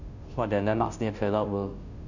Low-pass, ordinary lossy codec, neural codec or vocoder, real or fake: 7.2 kHz; none; codec, 16 kHz, 0.5 kbps, FunCodec, trained on Chinese and English, 25 frames a second; fake